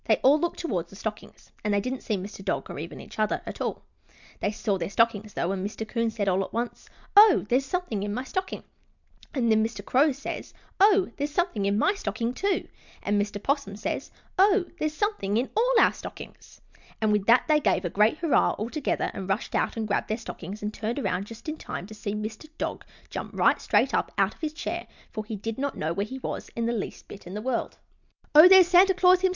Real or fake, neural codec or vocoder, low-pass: real; none; 7.2 kHz